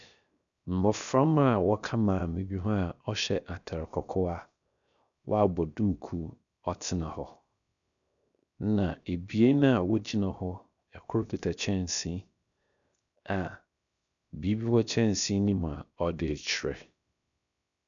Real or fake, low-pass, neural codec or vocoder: fake; 7.2 kHz; codec, 16 kHz, 0.7 kbps, FocalCodec